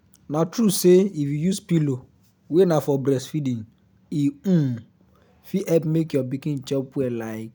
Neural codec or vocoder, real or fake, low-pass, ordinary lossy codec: none; real; none; none